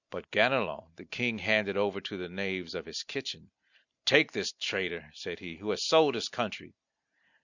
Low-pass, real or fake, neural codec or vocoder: 7.2 kHz; real; none